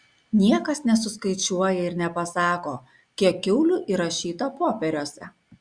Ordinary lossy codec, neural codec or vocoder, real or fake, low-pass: Opus, 64 kbps; none; real; 9.9 kHz